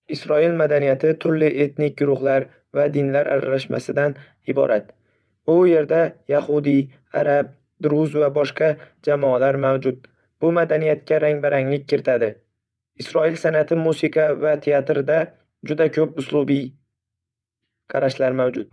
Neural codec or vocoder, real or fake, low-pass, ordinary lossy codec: vocoder, 22.05 kHz, 80 mel bands, WaveNeXt; fake; none; none